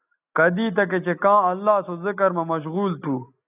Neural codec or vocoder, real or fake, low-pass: none; real; 3.6 kHz